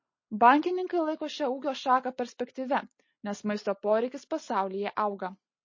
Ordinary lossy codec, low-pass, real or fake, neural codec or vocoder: MP3, 32 kbps; 7.2 kHz; real; none